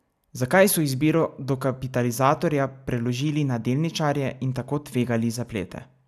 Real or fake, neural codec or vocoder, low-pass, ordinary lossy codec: real; none; 14.4 kHz; none